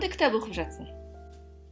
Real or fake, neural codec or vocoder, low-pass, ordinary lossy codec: real; none; none; none